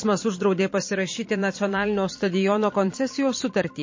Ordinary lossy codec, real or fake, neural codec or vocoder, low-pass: MP3, 32 kbps; fake; vocoder, 44.1 kHz, 128 mel bands every 512 samples, BigVGAN v2; 7.2 kHz